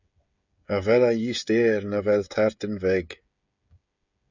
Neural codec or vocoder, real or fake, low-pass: codec, 16 kHz, 16 kbps, FreqCodec, smaller model; fake; 7.2 kHz